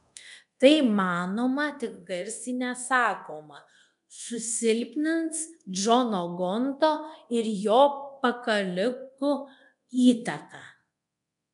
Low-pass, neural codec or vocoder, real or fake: 10.8 kHz; codec, 24 kHz, 0.9 kbps, DualCodec; fake